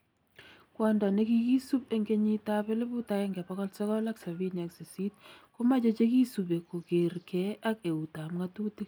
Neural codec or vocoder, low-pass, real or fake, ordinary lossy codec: none; none; real; none